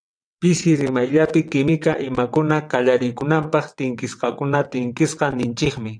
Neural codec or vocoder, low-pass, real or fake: vocoder, 22.05 kHz, 80 mel bands, WaveNeXt; 9.9 kHz; fake